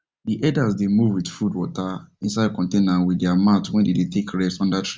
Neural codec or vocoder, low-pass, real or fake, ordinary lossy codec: none; none; real; none